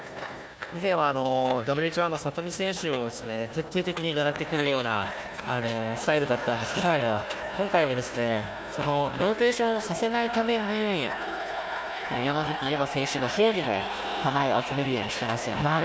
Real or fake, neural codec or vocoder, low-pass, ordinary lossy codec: fake; codec, 16 kHz, 1 kbps, FunCodec, trained on Chinese and English, 50 frames a second; none; none